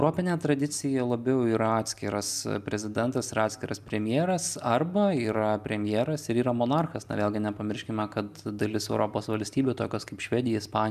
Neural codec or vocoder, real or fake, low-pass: none; real; 14.4 kHz